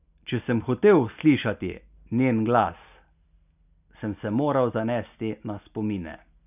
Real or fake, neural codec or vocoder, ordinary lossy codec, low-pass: real; none; none; 3.6 kHz